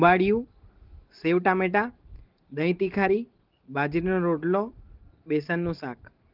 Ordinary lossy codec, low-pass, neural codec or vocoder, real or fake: Opus, 16 kbps; 5.4 kHz; none; real